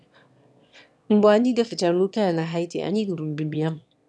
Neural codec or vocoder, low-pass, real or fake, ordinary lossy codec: autoencoder, 22.05 kHz, a latent of 192 numbers a frame, VITS, trained on one speaker; none; fake; none